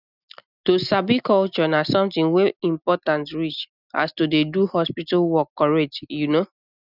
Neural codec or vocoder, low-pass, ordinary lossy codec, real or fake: none; 5.4 kHz; none; real